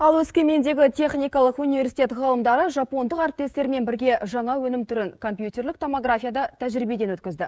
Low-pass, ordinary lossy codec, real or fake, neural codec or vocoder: none; none; fake; codec, 16 kHz, 16 kbps, FreqCodec, smaller model